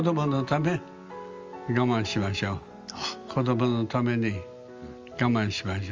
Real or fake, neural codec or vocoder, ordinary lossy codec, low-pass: real; none; Opus, 32 kbps; 7.2 kHz